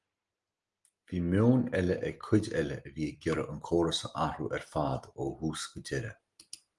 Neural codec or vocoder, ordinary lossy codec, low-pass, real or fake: none; Opus, 24 kbps; 10.8 kHz; real